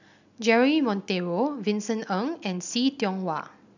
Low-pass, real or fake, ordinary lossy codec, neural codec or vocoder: 7.2 kHz; real; none; none